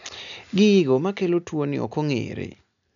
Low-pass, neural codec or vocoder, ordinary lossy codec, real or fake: 7.2 kHz; none; none; real